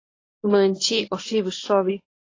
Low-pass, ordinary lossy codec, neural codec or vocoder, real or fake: 7.2 kHz; AAC, 32 kbps; codec, 24 kHz, 0.9 kbps, WavTokenizer, medium speech release version 1; fake